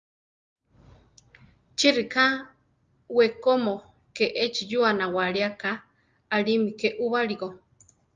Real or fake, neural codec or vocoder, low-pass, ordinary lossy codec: real; none; 7.2 kHz; Opus, 32 kbps